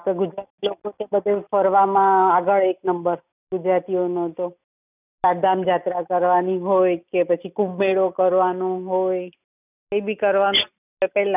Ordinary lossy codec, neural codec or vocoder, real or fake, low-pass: none; none; real; 3.6 kHz